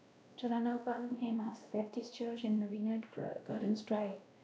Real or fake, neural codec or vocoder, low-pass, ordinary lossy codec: fake; codec, 16 kHz, 1 kbps, X-Codec, WavLM features, trained on Multilingual LibriSpeech; none; none